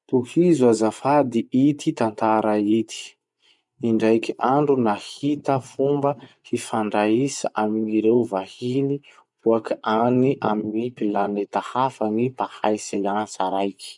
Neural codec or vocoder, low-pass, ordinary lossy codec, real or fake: vocoder, 24 kHz, 100 mel bands, Vocos; 10.8 kHz; none; fake